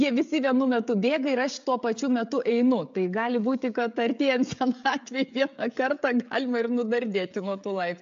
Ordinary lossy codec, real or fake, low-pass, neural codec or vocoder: AAC, 64 kbps; fake; 7.2 kHz; codec, 16 kHz, 16 kbps, FreqCodec, smaller model